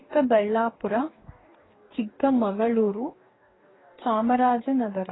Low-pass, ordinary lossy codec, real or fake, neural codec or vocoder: 7.2 kHz; AAC, 16 kbps; fake; codec, 44.1 kHz, 2.6 kbps, DAC